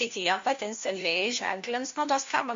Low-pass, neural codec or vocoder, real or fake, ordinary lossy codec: 7.2 kHz; codec, 16 kHz, 1 kbps, FunCodec, trained on LibriTTS, 50 frames a second; fake; MP3, 48 kbps